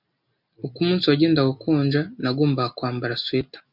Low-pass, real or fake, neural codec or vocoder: 5.4 kHz; real; none